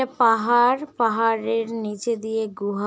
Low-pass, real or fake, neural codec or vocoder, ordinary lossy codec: none; real; none; none